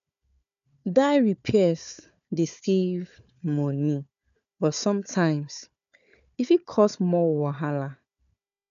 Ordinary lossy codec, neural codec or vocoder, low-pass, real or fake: none; codec, 16 kHz, 4 kbps, FunCodec, trained on Chinese and English, 50 frames a second; 7.2 kHz; fake